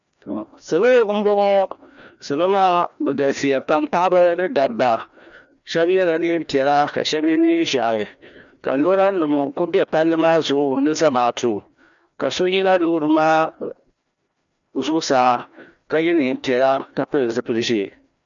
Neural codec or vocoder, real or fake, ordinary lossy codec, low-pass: codec, 16 kHz, 1 kbps, FreqCodec, larger model; fake; MP3, 96 kbps; 7.2 kHz